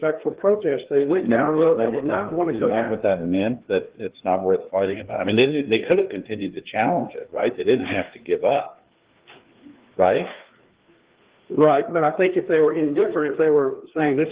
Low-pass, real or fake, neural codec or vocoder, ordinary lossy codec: 3.6 kHz; fake; codec, 16 kHz, 2 kbps, FreqCodec, larger model; Opus, 16 kbps